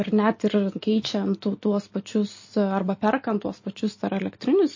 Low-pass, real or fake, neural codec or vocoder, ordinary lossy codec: 7.2 kHz; real; none; MP3, 32 kbps